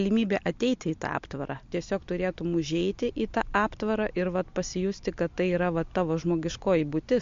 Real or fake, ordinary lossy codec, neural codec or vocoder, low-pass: real; MP3, 48 kbps; none; 7.2 kHz